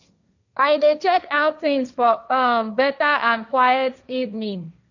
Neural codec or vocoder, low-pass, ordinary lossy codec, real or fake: codec, 16 kHz, 1.1 kbps, Voila-Tokenizer; 7.2 kHz; none; fake